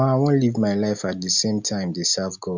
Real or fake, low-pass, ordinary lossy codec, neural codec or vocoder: real; 7.2 kHz; none; none